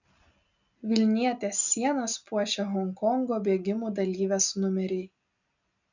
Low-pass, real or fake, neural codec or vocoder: 7.2 kHz; real; none